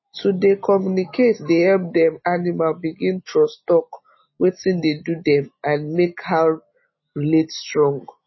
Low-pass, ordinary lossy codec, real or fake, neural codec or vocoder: 7.2 kHz; MP3, 24 kbps; real; none